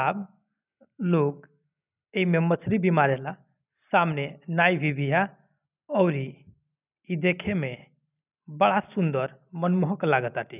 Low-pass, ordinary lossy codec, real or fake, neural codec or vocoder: 3.6 kHz; none; real; none